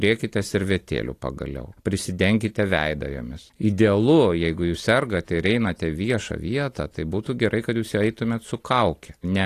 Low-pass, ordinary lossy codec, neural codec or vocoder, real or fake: 14.4 kHz; AAC, 48 kbps; none; real